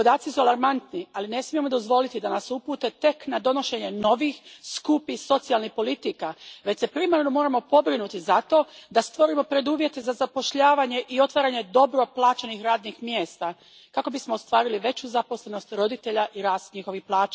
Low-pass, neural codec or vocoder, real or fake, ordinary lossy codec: none; none; real; none